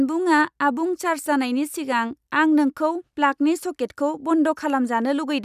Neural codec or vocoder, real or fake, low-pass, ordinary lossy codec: none; real; 14.4 kHz; none